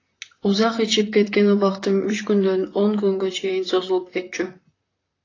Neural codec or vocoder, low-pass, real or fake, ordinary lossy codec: vocoder, 44.1 kHz, 128 mel bands, Pupu-Vocoder; 7.2 kHz; fake; AAC, 32 kbps